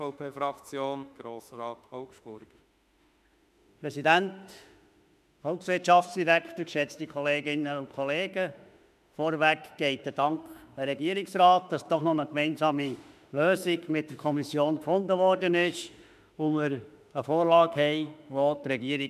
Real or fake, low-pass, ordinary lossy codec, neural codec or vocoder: fake; 14.4 kHz; none; autoencoder, 48 kHz, 32 numbers a frame, DAC-VAE, trained on Japanese speech